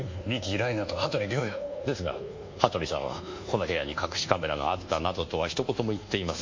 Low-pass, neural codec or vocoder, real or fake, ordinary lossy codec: 7.2 kHz; codec, 24 kHz, 1.2 kbps, DualCodec; fake; MP3, 48 kbps